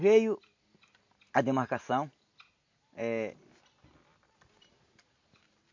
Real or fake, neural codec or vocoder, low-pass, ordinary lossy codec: real; none; 7.2 kHz; MP3, 48 kbps